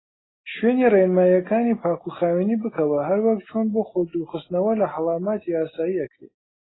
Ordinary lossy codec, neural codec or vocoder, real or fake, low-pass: AAC, 16 kbps; none; real; 7.2 kHz